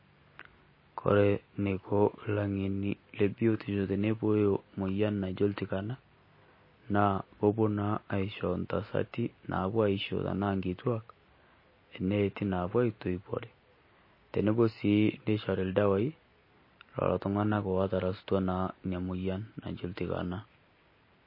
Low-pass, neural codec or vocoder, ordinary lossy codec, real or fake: 5.4 kHz; none; MP3, 24 kbps; real